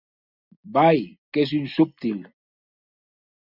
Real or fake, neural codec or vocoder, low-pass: real; none; 5.4 kHz